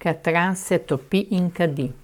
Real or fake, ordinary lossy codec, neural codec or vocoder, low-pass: fake; none; codec, 44.1 kHz, 7.8 kbps, Pupu-Codec; 19.8 kHz